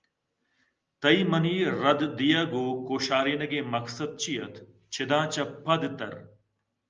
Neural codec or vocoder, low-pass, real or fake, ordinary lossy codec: none; 7.2 kHz; real; Opus, 24 kbps